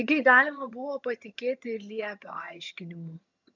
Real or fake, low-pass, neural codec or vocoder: fake; 7.2 kHz; vocoder, 22.05 kHz, 80 mel bands, HiFi-GAN